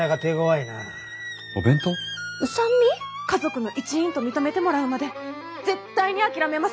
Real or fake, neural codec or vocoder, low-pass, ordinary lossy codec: real; none; none; none